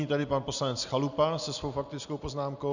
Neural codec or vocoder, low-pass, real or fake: none; 7.2 kHz; real